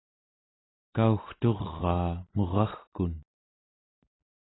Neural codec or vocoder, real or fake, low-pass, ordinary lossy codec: none; real; 7.2 kHz; AAC, 16 kbps